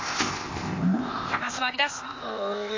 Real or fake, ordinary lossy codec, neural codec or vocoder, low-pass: fake; MP3, 32 kbps; codec, 16 kHz, 0.8 kbps, ZipCodec; 7.2 kHz